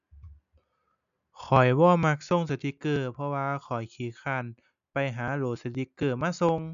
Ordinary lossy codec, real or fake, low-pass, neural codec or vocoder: none; real; 7.2 kHz; none